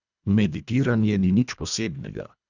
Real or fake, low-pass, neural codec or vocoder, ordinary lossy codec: fake; 7.2 kHz; codec, 24 kHz, 1.5 kbps, HILCodec; none